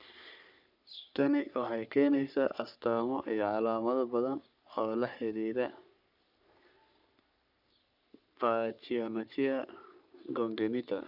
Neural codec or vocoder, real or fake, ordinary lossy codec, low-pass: codec, 44.1 kHz, 3.4 kbps, Pupu-Codec; fake; none; 5.4 kHz